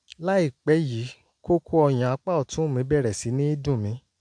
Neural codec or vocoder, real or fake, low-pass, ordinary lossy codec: none; real; 9.9 kHz; MP3, 64 kbps